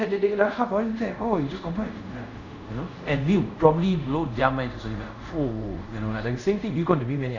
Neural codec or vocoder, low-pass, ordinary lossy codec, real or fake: codec, 24 kHz, 0.5 kbps, DualCodec; 7.2 kHz; none; fake